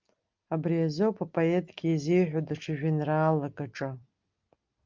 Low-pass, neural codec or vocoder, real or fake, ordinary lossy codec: 7.2 kHz; none; real; Opus, 24 kbps